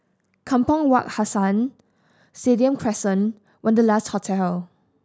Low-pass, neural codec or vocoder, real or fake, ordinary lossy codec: none; none; real; none